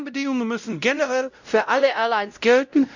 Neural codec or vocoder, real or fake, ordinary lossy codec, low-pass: codec, 16 kHz, 0.5 kbps, X-Codec, WavLM features, trained on Multilingual LibriSpeech; fake; none; 7.2 kHz